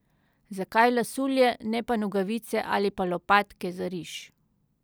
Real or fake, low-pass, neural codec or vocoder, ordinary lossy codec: real; none; none; none